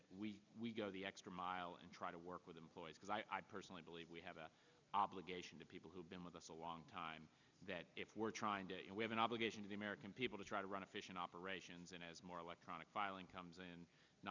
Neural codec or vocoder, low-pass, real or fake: none; 7.2 kHz; real